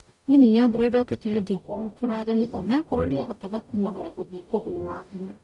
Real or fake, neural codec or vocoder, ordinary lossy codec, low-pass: fake; codec, 44.1 kHz, 0.9 kbps, DAC; none; 10.8 kHz